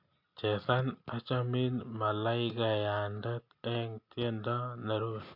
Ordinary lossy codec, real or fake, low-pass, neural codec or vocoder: AAC, 48 kbps; real; 5.4 kHz; none